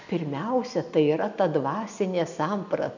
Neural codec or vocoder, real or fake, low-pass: none; real; 7.2 kHz